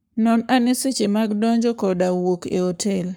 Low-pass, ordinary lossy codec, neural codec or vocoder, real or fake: none; none; codec, 44.1 kHz, 7.8 kbps, Pupu-Codec; fake